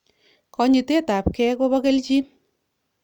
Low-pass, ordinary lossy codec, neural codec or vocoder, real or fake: 19.8 kHz; none; none; real